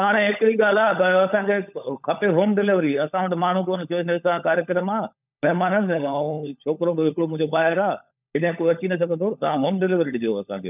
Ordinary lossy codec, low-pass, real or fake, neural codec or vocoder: none; 3.6 kHz; fake; codec, 16 kHz, 16 kbps, FunCodec, trained on LibriTTS, 50 frames a second